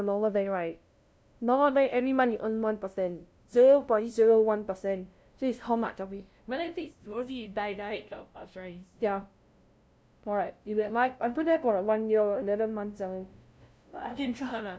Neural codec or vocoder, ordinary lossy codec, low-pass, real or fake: codec, 16 kHz, 0.5 kbps, FunCodec, trained on LibriTTS, 25 frames a second; none; none; fake